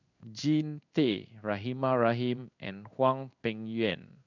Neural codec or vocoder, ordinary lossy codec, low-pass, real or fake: codec, 16 kHz in and 24 kHz out, 1 kbps, XY-Tokenizer; none; 7.2 kHz; fake